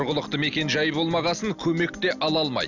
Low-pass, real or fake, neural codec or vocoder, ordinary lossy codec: 7.2 kHz; real; none; none